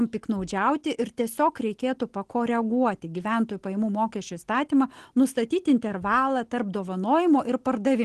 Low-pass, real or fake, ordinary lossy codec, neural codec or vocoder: 10.8 kHz; real; Opus, 16 kbps; none